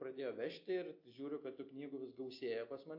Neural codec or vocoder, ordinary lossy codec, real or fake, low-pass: none; AAC, 48 kbps; real; 5.4 kHz